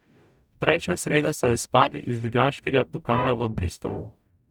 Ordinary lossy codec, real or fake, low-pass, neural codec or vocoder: none; fake; 19.8 kHz; codec, 44.1 kHz, 0.9 kbps, DAC